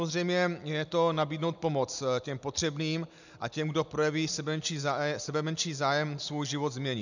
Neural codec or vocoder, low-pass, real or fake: none; 7.2 kHz; real